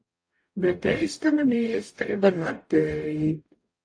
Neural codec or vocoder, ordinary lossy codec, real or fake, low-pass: codec, 44.1 kHz, 0.9 kbps, DAC; AAC, 48 kbps; fake; 9.9 kHz